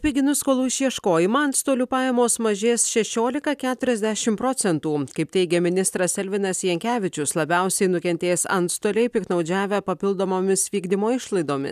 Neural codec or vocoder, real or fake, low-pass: none; real; 14.4 kHz